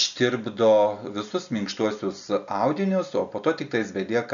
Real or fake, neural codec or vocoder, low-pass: real; none; 7.2 kHz